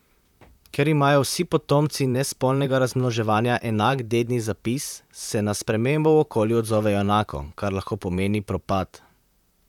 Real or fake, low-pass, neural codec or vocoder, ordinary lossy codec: fake; 19.8 kHz; vocoder, 44.1 kHz, 128 mel bands, Pupu-Vocoder; none